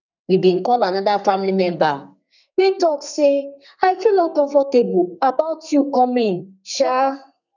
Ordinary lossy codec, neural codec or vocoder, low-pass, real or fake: none; codec, 32 kHz, 1.9 kbps, SNAC; 7.2 kHz; fake